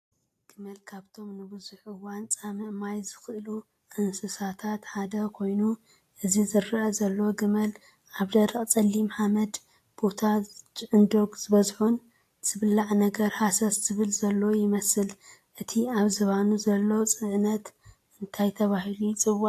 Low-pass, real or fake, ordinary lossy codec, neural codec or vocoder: 14.4 kHz; real; AAC, 64 kbps; none